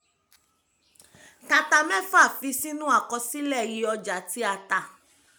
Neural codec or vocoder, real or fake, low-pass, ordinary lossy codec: none; real; none; none